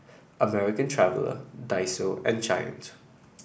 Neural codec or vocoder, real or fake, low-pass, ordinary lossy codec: none; real; none; none